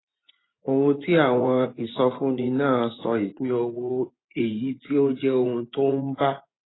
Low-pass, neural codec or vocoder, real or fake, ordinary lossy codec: 7.2 kHz; vocoder, 24 kHz, 100 mel bands, Vocos; fake; AAC, 16 kbps